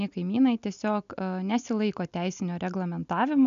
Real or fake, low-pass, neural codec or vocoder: real; 7.2 kHz; none